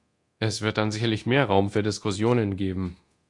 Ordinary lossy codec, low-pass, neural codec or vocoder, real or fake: AAC, 64 kbps; 10.8 kHz; codec, 24 kHz, 0.9 kbps, DualCodec; fake